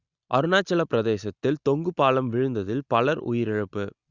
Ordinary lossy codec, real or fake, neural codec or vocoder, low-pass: Opus, 64 kbps; real; none; 7.2 kHz